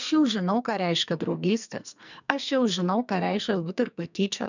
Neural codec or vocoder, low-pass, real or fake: codec, 44.1 kHz, 2.6 kbps, SNAC; 7.2 kHz; fake